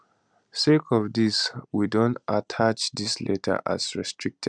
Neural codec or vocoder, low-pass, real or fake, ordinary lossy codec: none; 9.9 kHz; real; none